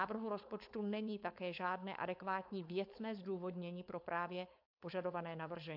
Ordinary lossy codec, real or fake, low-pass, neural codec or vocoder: MP3, 48 kbps; fake; 5.4 kHz; codec, 16 kHz, 4.8 kbps, FACodec